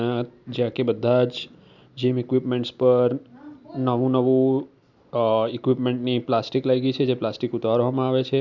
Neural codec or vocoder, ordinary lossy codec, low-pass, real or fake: none; none; 7.2 kHz; real